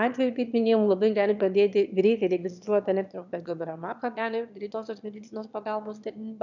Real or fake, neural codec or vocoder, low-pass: fake; autoencoder, 22.05 kHz, a latent of 192 numbers a frame, VITS, trained on one speaker; 7.2 kHz